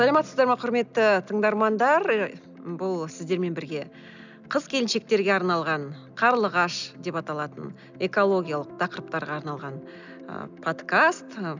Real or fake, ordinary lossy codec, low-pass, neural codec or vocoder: real; none; 7.2 kHz; none